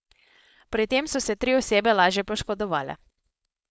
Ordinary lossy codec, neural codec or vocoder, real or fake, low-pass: none; codec, 16 kHz, 4.8 kbps, FACodec; fake; none